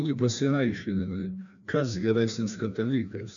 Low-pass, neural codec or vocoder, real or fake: 7.2 kHz; codec, 16 kHz, 1 kbps, FreqCodec, larger model; fake